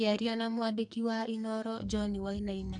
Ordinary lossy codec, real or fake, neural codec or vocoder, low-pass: none; fake; codec, 44.1 kHz, 2.6 kbps, SNAC; 10.8 kHz